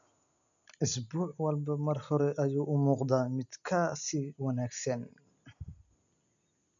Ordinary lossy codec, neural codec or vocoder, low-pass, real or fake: none; none; 7.2 kHz; real